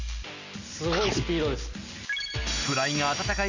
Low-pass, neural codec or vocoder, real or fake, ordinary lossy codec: 7.2 kHz; none; real; Opus, 64 kbps